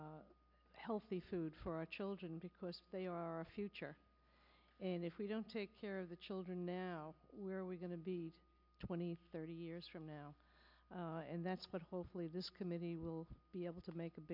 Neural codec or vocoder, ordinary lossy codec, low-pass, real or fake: none; MP3, 48 kbps; 5.4 kHz; real